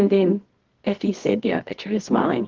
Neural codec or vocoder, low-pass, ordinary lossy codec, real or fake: codec, 24 kHz, 0.9 kbps, WavTokenizer, medium music audio release; 7.2 kHz; Opus, 16 kbps; fake